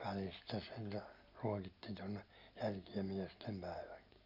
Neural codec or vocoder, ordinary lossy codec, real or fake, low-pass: none; none; real; 5.4 kHz